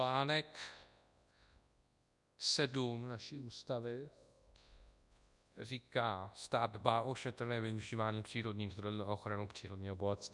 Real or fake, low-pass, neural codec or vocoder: fake; 10.8 kHz; codec, 24 kHz, 0.9 kbps, WavTokenizer, large speech release